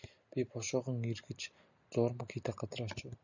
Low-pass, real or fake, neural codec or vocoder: 7.2 kHz; real; none